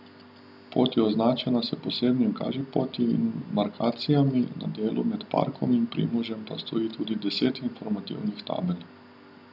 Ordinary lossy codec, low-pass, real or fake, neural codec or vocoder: none; 5.4 kHz; real; none